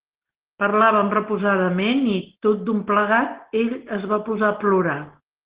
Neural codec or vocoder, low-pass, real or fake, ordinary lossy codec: none; 3.6 kHz; real; Opus, 16 kbps